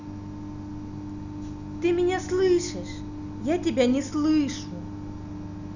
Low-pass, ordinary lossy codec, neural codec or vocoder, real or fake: 7.2 kHz; none; none; real